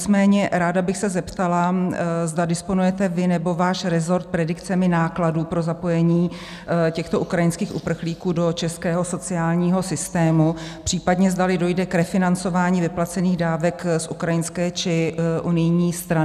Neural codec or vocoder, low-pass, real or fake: none; 14.4 kHz; real